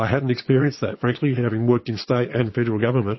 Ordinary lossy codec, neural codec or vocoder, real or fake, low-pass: MP3, 24 kbps; vocoder, 22.05 kHz, 80 mel bands, Vocos; fake; 7.2 kHz